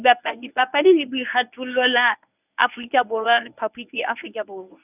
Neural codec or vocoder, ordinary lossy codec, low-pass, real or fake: codec, 24 kHz, 0.9 kbps, WavTokenizer, medium speech release version 1; none; 3.6 kHz; fake